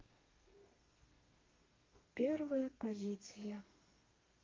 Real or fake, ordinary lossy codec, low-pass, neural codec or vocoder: fake; Opus, 32 kbps; 7.2 kHz; codec, 32 kHz, 1.9 kbps, SNAC